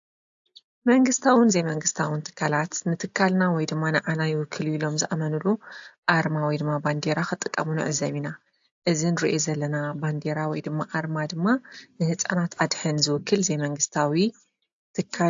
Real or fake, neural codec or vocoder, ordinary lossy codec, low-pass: real; none; AAC, 64 kbps; 7.2 kHz